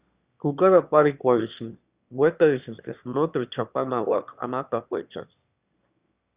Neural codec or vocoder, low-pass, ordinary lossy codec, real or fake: autoencoder, 22.05 kHz, a latent of 192 numbers a frame, VITS, trained on one speaker; 3.6 kHz; Opus, 64 kbps; fake